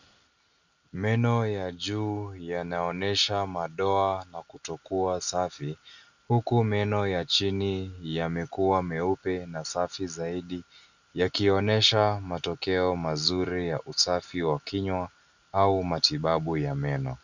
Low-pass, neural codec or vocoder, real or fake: 7.2 kHz; none; real